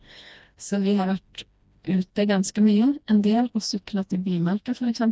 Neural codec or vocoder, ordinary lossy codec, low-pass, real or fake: codec, 16 kHz, 1 kbps, FreqCodec, smaller model; none; none; fake